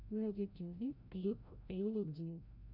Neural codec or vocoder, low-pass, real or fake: codec, 16 kHz, 0.5 kbps, FreqCodec, larger model; 5.4 kHz; fake